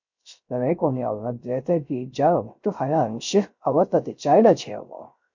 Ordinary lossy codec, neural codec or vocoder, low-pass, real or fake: MP3, 48 kbps; codec, 16 kHz, 0.3 kbps, FocalCodec; 7.2 kHz; fake